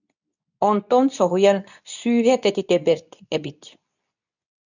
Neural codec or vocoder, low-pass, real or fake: codec, 24 kHz, 0.9 kbps, WavTokenizer, medium speech release version 2; 7.2 kHz; fake